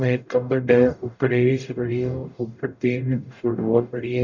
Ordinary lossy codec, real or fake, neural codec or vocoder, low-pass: none; fake; codec, 44.1 kHz, 0.9 kbps, DAC; 7.2 kHz